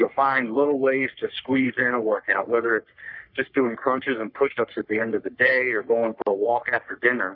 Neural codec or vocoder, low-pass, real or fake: codec, 44.1 kHz, 3.4 kbps, Pupu-Codec; 5.4 kHz; fake